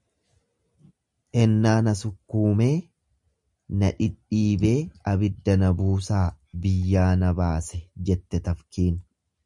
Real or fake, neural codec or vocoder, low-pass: real; none; 10.8 kHz